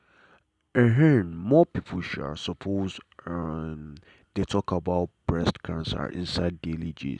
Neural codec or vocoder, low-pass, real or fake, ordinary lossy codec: none; 10.8 kHz; real; none